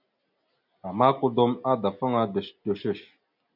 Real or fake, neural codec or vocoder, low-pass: real; none; 5.4 kHz